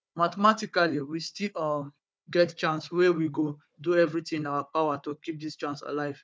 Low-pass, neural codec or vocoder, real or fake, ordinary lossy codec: none; codec, 16 kHz, 4 kbps, FunCodec, trained on Chinese and English, 50 frames a second; fake; none